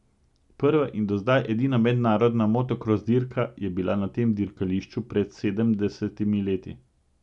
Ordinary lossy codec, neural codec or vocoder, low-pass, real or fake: none; none; 10.8 kHz; real